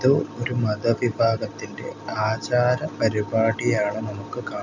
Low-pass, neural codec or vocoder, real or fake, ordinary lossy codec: 7.2 kHz; none; real; none